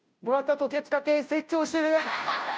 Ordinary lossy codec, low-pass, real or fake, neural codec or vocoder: none; none; fake; codec, 16 kHz, 0.5 kbps, FunCodec, trained on Chinese and English, 25 frames a second